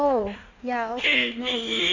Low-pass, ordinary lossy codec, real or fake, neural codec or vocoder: 7.2 kHz; none; fake; codec, 16 kHz in and 24 kHz out, 1.1 kbps, FireRedTTS-2 codec